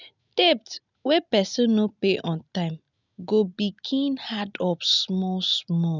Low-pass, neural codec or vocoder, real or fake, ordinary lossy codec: 7.2 kHz; none; real; none